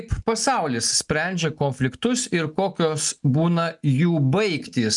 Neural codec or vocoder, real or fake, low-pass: none; real; 10.8 kHz